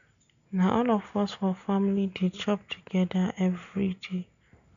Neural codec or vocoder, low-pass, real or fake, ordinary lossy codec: none; 7.2 kHz; real; none